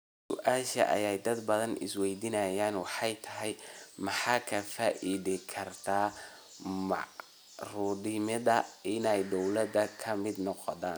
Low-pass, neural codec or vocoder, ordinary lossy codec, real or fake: none; none; none; real